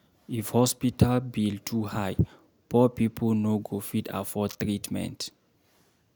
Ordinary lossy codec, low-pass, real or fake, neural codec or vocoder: none; none; real; none